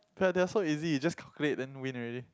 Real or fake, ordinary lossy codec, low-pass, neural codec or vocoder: real; none; none; none